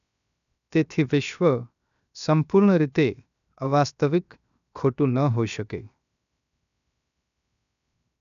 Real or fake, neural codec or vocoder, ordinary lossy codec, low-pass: fake; codec, 16 kHz, 0.7 kbps, FocalCodec; none; 7.2 kHz